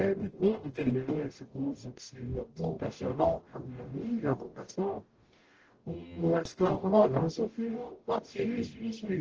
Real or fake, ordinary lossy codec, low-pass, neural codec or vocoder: fake; Opus, 16 kbps; 7.2 kHz; codec, 44.1 kHz, 0.9 kbps, DAC